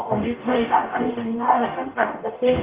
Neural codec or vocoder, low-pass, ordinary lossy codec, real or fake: codec, 44.1 kHz, 0.9 kbps, DAC; 3.6 kHz; Opus, 32 kbps; fake